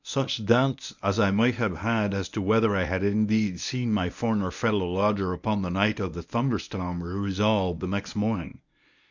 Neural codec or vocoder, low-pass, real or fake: codec, 24 kHz, 0.9 kbps, WavTokenizer, medium speech release version 1; 7.2 kHz; fake